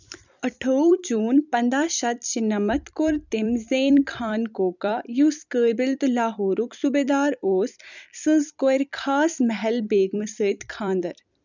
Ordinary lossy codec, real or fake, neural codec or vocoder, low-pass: none; fake; vocoder, 44.1 kHz, 128 mel bands every 256 samples, BigVGAN v2; 7.2 kHz